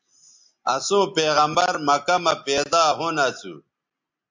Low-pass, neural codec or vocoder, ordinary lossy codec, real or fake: 7.2 kHz; none; MP3, 64 kbps; real